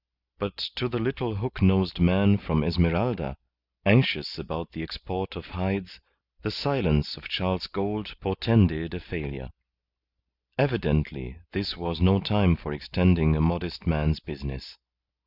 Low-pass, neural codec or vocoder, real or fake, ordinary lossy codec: 5.4 kHz; none; real; Opus, 64 kbps